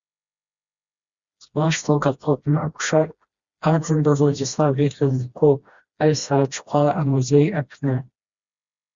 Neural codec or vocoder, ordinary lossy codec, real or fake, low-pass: codec, 16 kHz, 1 kbps, FreqCodec, smaller model; Opus, 64 kbps; fake; 7.2 kHz